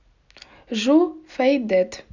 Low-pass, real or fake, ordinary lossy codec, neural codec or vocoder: 7.2 kHz; real; none; none